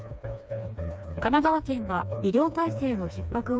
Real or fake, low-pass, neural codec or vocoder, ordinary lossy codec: fake; none; codec, 16 kHz, 2 kbps, FreqCodec, smaller model; none